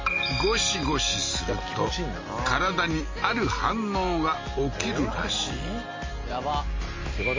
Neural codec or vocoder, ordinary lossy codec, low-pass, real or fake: none; MP3, 32 kbps; 7.2 kHz; real